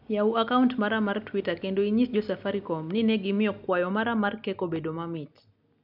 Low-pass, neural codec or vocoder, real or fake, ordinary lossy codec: 5.4 kHz; none; real; none